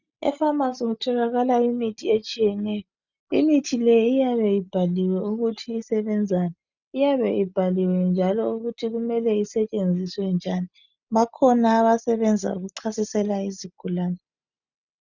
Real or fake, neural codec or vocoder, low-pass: real; none; 7.2 kHz